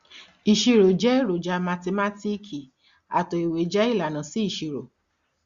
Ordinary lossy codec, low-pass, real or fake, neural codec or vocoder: Opus, 64 kbps; 7.2 kHz; real; none